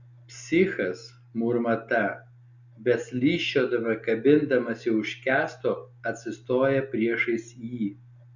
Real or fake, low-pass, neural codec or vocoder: real; 7.2 kHz; none